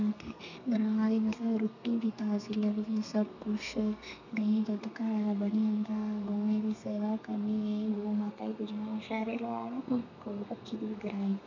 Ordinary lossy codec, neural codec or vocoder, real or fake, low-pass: none; codec, 32 kHz, 1.9 kbps, SNAC; fake; 7.2 kHz